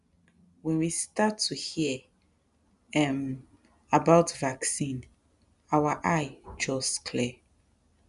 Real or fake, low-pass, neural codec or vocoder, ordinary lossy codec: real; 10.8 kHz; none; none